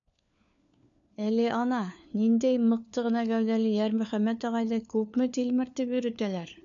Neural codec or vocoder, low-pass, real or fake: codec, 16 kHz, 4 kbps, FunCodec, trained on LibriTTS, 50 frames a second; 7.2 kHz; fake